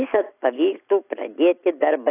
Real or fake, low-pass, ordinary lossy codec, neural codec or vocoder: real; 3.6 kHz; AAC, 24 kbps; none